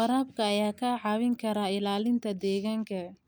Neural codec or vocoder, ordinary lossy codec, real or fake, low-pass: none; none; real; none